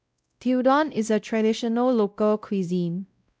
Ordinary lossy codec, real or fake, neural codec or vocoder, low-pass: none; fake; codec, 16 kHz, 0.5 kbps, X-Codec, WavLM features, trained on Multilingual LibriSpeech; none